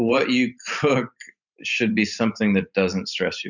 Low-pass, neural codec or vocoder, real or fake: 7.2 kHz; vocoder, 44.1 kHz, 128 mel bands every 512 samples, BigVGAN v2; fake